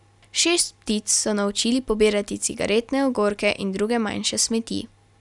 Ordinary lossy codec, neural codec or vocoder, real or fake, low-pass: none; none; real; 10.8 kHz